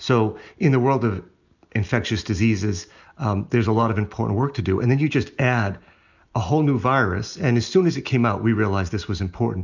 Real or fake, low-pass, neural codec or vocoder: real; 7.2 kHz; none